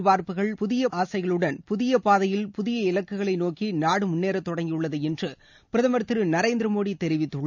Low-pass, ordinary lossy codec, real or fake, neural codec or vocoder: 7.2 kHz; none; real; none